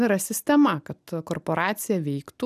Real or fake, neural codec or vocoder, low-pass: real; none; 14.4 kHz